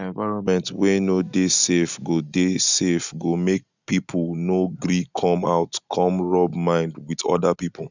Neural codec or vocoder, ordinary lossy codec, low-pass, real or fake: none; none; 7.2 kHz; real